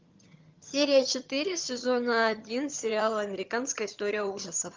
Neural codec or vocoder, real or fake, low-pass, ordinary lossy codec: vocoder, 22.05 kHz, 80 mel bands, HiFi-GAN; fake; 7.2 kHz; Opus, 32 kbps